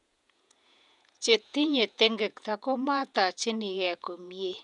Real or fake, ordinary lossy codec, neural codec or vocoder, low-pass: fake; none; vocoder, 48 kHz, 128 mel bands, Vocos; 10.8 kHz